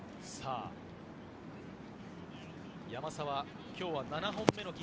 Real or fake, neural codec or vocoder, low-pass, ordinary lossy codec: real; none; none; none